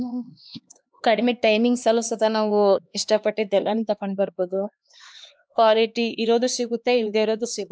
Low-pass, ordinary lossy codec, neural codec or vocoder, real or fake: none; none; codec, 16 kHz, 2 kbps, X-Codec, HuBERT features, trained on LibriSpeech; fake